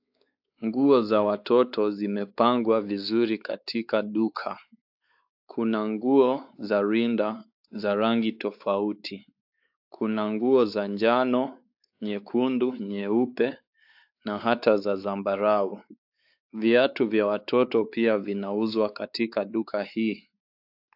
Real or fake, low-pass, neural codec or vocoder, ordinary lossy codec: fake; 5.4 kHz; codec, 16 kHz, 4 kbps, X-Codec, WavLM features, trained on Multilingual LibriSpeech; AAC, 48 kbps